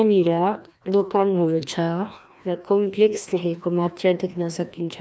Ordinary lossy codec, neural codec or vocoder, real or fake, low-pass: none; codec, 16 kHz, 1 kbps, FreqCodec, larger model; fake; none